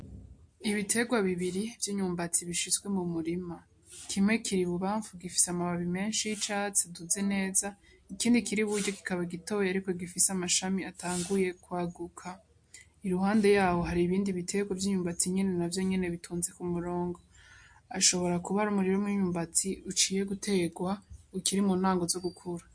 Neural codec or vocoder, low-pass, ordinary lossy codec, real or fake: none; 9.9 kHz; MP3, 48 kbps; real